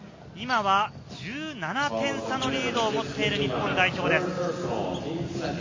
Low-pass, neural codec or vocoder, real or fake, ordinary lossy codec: 7.2 kHz; none; real; MP3, 32 kbps